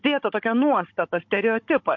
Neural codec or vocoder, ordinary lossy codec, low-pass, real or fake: codec, 16 kHz, 16 kbps, FunCodec, trained on Chinese and English, 50 frames a second; MP3, 48 kbps; 7.2 kHz; fake